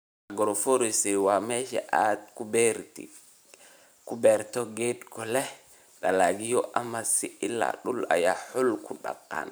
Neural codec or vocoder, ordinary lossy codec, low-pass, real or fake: vocoder, 44.1 kHz, 128 mel bands every 512 samples, BigVGAN v2; none; none; fake